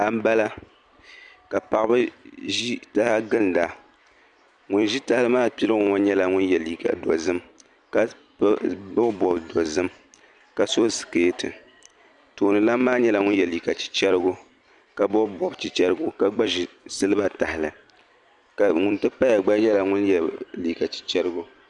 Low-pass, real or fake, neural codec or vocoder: 10.8 kHz; fake; vocoder, 44.1 kHz, 128 mel bands every 256 samples, BigVGAN v2